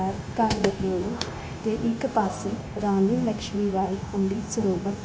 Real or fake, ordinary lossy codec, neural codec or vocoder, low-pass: fake; none; codec, 16 kHz, 0.9 kbps, LongCat-Audio-Codec; none